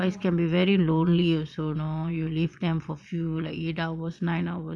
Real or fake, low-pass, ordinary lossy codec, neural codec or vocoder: fake; none; none; vocoder, 22.05 kHz, 80 mel bands, Vocos